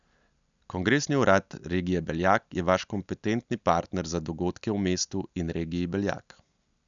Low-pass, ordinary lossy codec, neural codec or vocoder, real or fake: 7.2 kHz; none; none; real